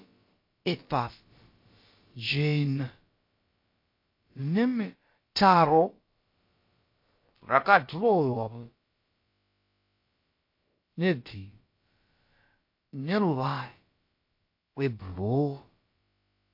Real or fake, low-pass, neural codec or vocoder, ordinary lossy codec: fake; 5.4 kHz; codec, 16 kHz, about 1 kbps, DyCAST, with the encoder's durations; MP3, 32 kbps